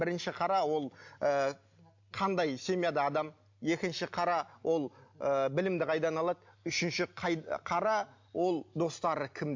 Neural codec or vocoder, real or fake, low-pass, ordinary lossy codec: none; real; 7.2 kHz; MP3, 48 kbps